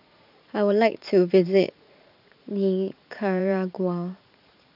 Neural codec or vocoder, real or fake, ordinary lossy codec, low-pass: vocoder, 44.1 kHz, 80 mel bands, Vocos; fake; none; 5.4 kHz